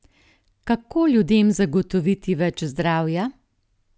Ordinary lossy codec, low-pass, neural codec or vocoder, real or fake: none; none; none; real